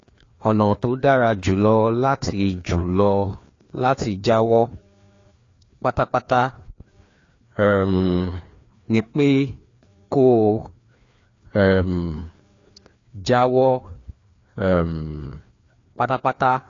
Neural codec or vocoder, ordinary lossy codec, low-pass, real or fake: codec, 16 kHz, 2 kbps, FreqCodec, larger model; AAC, 32 kbps; 7.2 kHz; fake